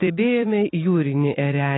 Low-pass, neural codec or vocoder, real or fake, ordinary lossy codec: 7.2 kHz; vocoder, 44.1 kHz, 128 mel bands every 256 samples, BigVGAN v2; fake; AAC, 16 kbps